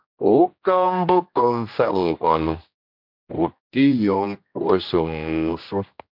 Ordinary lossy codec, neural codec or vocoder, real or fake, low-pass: MP3, 48 kbps; codec, 16 kHz, 1 kbps, X-Codec, HuBERT features, trained on general audio; fake; 5.4 kHz